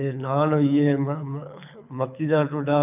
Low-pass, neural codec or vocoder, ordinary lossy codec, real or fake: 3.6 kHz; codec, 24 kHz, 6 kbps, HILCodec; none; fake